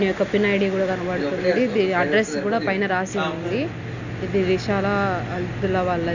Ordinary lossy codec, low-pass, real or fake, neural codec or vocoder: none; 7.2 kHz; real; none